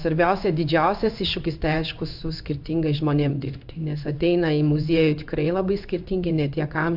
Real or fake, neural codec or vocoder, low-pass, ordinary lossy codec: fake; codec, 16 kHz in and 24 kHz out, 1 kbps, XY-Tokenizer; 5.4 kHz; AAC, 48 kbps